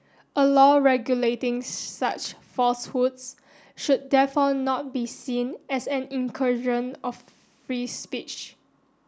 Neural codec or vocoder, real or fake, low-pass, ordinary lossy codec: none; real; none; none